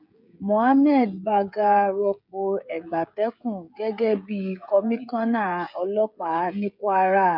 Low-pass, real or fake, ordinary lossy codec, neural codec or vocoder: 5.4 kHz; fake; none; codec, 16 kHz, 16 kbps, FreqCodec, smaller model